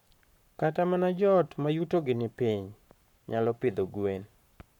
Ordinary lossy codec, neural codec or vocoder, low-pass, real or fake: none; codec, 44.1 kHz, 7.8 kbps, Pupu-Codec; 19.8 kHz; fake